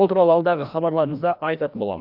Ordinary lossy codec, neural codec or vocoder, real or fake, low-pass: none; codec, 16 kHz, 1 kbps, FreqCodec, larger model; fake; 5.4 kHz